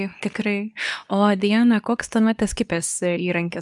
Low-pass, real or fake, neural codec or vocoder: 10.8 kHz; fake; codec, 24 kHz, 0.9 kbps, WavTokenizer, medium speech release version 2